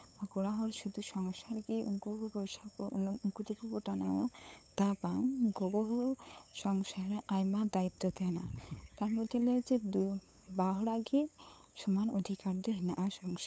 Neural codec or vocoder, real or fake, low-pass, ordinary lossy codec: codec, 16 kHz, 8 kbps, FunCodec, trained on LibriTTS, 25 frames a second; fake; none; none